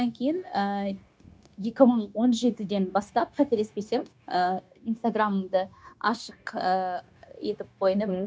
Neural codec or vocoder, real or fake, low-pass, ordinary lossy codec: codec, 16 kHz, 0.9 kbps, LongCat-Audio-Codec; fake; none; none